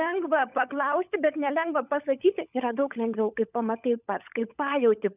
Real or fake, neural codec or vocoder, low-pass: fake; codec, 16 kHz, 16 kbps, FunCodec, trained on LibriTTS, 50 frames a second; 3.6 kHz